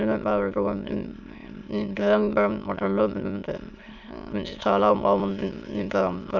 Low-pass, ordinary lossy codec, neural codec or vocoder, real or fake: 7.2 kHz; none; autoencoder, 22.05 kHz, a latent of 192 numbers a frame, VITS, trained on many speakers; fake